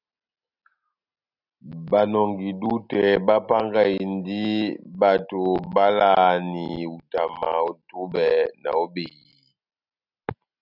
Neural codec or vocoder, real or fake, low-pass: none; real; 5.4 kHz